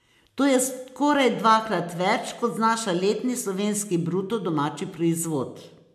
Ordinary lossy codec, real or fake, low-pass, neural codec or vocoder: MP3, 96 kbps; real; 14.4 kHz; none